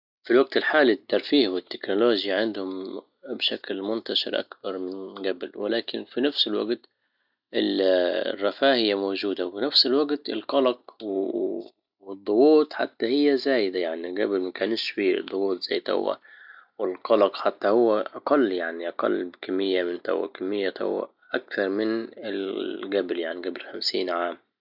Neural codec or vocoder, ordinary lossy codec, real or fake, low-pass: none; none; real; 5.4 kHz